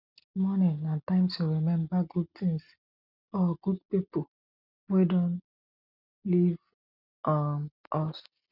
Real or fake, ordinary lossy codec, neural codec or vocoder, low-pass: real; none; none; 5.4 kHz